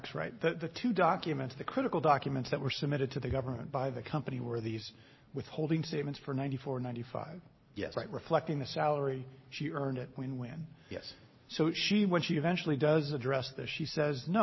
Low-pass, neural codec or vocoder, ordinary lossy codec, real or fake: 7.2 kHz; none; MP3, 24 kbps; real